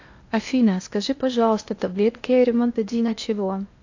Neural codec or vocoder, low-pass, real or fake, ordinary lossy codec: codec, 16 kHz in and 24 kHz out, 0.8 kbps, FocalCodec, streaming, 65536 codes; 7.2 kHz; fake; AAC, 48 kbps